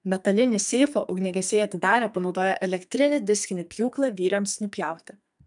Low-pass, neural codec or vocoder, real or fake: 10.8 kHz; codec, 44.1 kHz, 2.6 kbps, SNAC; fake